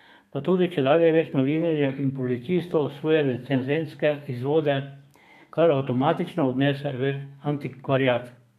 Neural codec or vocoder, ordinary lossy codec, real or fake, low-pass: codec, 32 kHz, 1.9 kbps, SNAC; none; fake; 14.4 kHz